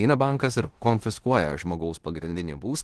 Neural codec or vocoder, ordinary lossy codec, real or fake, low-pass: codec, 16 kHz in and 24 kHz out, 0.9 kbps, LongCat-Audio-Codec, four codebook decoder; Opus, 16 kbps; fake; 10.8 kHz